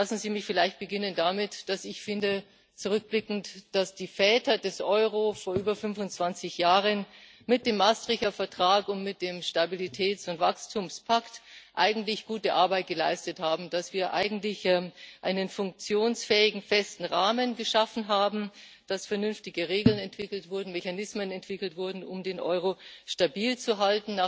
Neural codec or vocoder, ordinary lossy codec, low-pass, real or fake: none; none; none; real